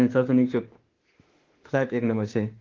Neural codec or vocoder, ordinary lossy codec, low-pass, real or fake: autoencoder, 48 kHz, 32 numbers a frame, DAC-VAE, trained on Japanese speech; Opus, 16 kbps; 7.2 kHz; fake